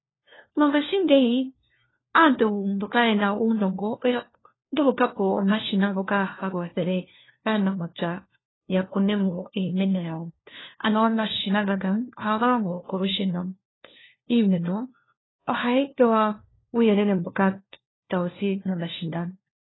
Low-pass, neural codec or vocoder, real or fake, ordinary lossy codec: 7.2 kHz; codec, 16 kHz, 1 kbps, FunCodec, trained on LibriTTS, 50 frames a second; fake; AAC, 16 kbps